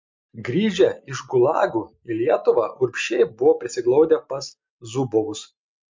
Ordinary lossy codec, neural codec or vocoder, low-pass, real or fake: MP3, 48 kbps; none; 7.2 kHz; real